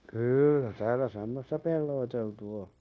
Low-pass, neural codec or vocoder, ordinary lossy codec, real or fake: none; codec, 16 kHz, 0.9 kbps, LongCat-Audio-Codec; none; fake